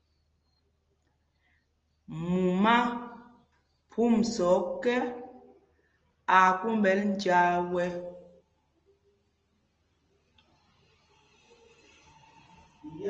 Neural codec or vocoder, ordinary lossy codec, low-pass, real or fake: none; Opus, 24 kbps; 7.2 kHz; real